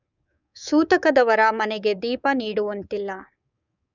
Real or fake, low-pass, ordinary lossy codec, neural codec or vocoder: fake; 7.2 kHz; none; codec, 24 kHz, 3.1 kbps, DualCodec